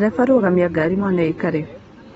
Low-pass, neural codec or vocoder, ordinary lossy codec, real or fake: 19.8 kHz; none; AAC, 24 kbps; real